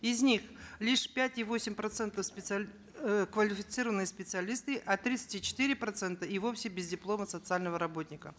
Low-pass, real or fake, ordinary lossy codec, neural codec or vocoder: none; real; none; none